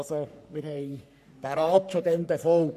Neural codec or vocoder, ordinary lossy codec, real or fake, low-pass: codec, 44.1 kHz, 3.4 kbps, Pupu-Codec; none; fake; 14.4 kHz